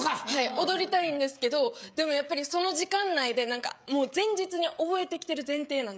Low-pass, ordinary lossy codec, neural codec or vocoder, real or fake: none; none; codec, 16 kHz, 16 kbps, FreqCodec, smaller model; fake